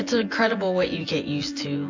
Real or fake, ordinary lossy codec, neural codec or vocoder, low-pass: fake; AAC, 48 kbps; vocoder, 24 kHz, 100 mel bands, Vocos; 7.2 kHz